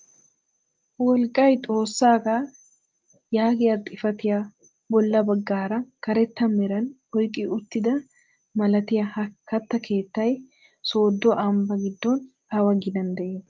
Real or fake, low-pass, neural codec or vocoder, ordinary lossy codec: real; 7.2 kHz; none; Opus, 24 kbps